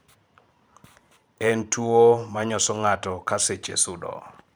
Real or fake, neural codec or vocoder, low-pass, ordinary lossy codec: fake; vocoder, 44.1 kHz, 128 mel bands every 512 samples, BigVGAN v2; none; none